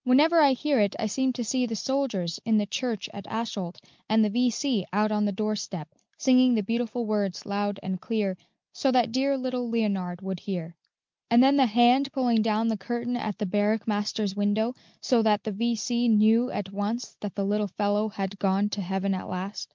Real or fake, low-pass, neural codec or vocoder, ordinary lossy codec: real; 7.2 kHz; none; Opus, 24 kbps